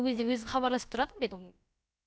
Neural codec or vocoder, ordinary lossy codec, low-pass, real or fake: codec, 16 kHz, about 1 kbps, DyCAST, with the encoder's durations; none; none; fake